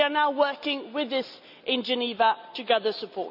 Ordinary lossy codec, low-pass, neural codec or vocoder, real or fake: none; 5.4 kHz; none; real